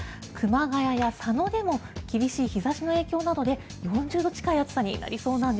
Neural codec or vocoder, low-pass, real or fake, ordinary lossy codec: none; none; real; none